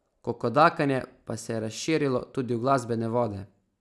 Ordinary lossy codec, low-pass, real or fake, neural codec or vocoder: none; none; real; none